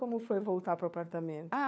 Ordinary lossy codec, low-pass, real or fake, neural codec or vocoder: none; none; fake; codec, 16 kHz, 4 kbps, FunCodec, trained on LibriTTS, 50 frames a second